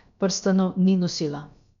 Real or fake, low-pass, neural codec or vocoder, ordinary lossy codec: fake; 7.2 kHz; codec, 16 kHz, about 1 kbps, DyCAST, with the encoder's durations; none